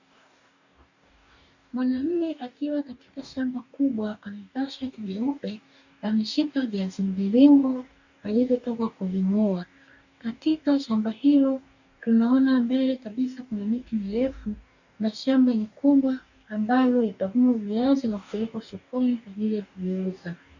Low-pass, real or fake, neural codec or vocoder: 7.2 kHz; fake; codec, 44.1 kHz, 2.6 kbps, DAC